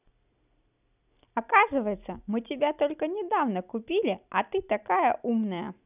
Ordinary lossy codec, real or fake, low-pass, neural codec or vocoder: none; real; 3.6 kHz; none